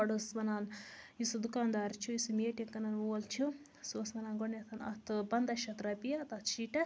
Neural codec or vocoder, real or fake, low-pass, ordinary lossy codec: none; real; none; none